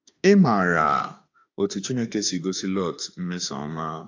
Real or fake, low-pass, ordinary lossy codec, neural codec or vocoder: fake; 7.2 kHz; AAC, 48 kbps; autoencoder, 48 kHz, 32 numbers a frame, DAC-VAE, trained on Japanese speech